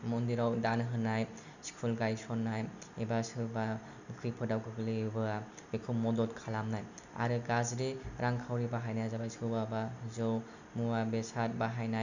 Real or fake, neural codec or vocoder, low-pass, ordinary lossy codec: real; none; 7.2 kHz; none